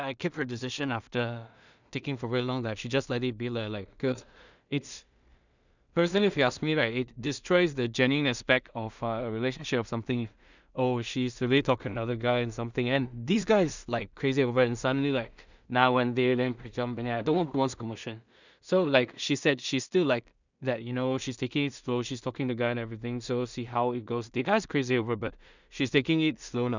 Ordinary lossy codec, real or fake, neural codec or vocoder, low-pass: none; fake; codec, 16 kHz in and 24 kHz out, 0.4 kbps, LongCat-Audio-Codec, two codebook decoder; 7.2 kHz